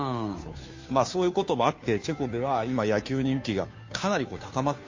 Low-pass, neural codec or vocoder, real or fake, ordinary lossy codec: 7.2 kHz; codec, 16 kHz, 2 kbps, FunCodec, trained on Chinese and English, 25 frames a second; fake; MP3, 32 kbps